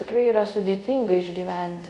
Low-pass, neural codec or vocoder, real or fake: 10.8 kHz; codec, 24 kHz, 0.5 kbps, DualCodec; fake